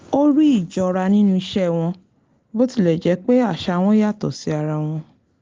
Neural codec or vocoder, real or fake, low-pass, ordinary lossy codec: none; real; 7.2 kHz; Opus, 32 kbps